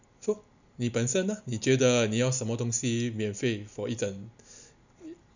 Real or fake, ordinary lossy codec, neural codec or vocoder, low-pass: real; none; none; 7.2 kHz